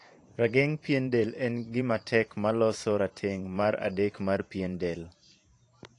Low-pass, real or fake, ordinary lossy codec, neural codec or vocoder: 10.8 kHz; fake; AAC, 48 kbps; vocoder, 44.1 kHz, 128 mel bands every 512 samples, BigVGAN v2